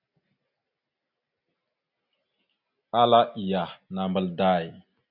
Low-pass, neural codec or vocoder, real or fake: 5.4 kHz; none; real